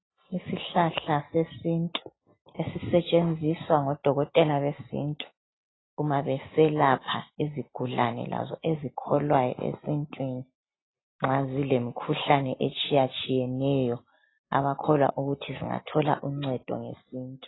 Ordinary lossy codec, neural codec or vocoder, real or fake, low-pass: AAC, 16 kbps; none; real; 7.2 kHz